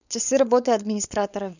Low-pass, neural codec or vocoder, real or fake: 7.2 kHz; codec, 16 kHz, 4.8 kbps, FACodec; fake